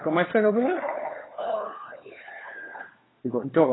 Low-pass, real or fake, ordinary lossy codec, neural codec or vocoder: 7.2 kHz; fake; AAC, 16 kbps; codec, 16 kHz, 4 kbps, FunCodec, trained on LibriTTS, 50 frames a second